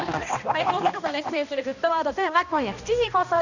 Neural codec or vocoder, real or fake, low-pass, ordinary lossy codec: codec, 16 kHz, 1 kbps, X-Codec, HuBERT features, trained on balanced general audio; fake; 7.2 kHz; none